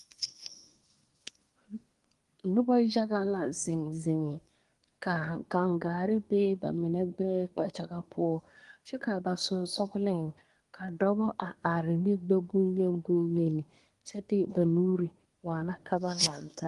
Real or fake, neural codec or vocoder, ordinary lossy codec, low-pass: fake; codec, 24 kHz, 1 kbps, SNAC; Opus, 24 kbps; 10.8 kHz